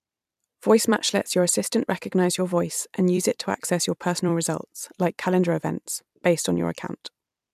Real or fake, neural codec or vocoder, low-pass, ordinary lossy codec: fake; vocoder, 44.1 kHz, 128 mel bands every 256 samples, BigVGAN v2; 14.4 kHz; MP3, 96 kbps